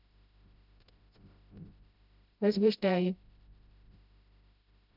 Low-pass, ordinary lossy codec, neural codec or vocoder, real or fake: 5.4 kHz; none; codec, 16 kHz, 0.5 kbps, FreqCodec, smaller model; fake